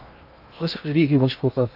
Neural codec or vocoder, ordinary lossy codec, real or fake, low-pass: codec, 16 kHz in and 24 kHz out, 0.6 kbps, FocalCodec, streaming, 2048 codes; AAC, 48 kbps; fake; 5.4 kHz